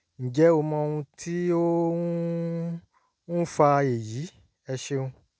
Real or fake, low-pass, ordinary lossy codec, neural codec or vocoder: real; none; none; none